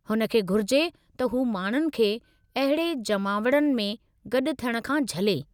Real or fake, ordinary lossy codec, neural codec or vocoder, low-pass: real; none; none; 19.8 kHz